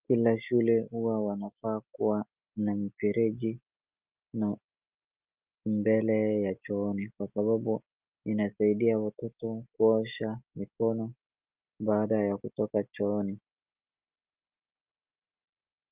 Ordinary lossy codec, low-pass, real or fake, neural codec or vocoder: Opus, 32 kbps; 3.6 kHz; real; none